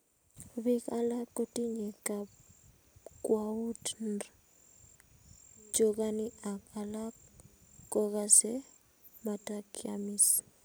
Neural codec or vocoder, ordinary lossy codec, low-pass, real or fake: none; none; none; real